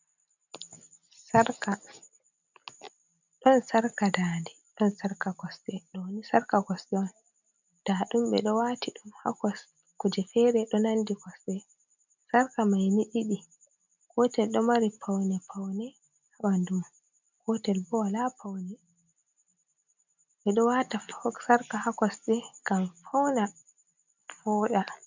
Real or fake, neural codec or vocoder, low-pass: real; none; 7.2 kHz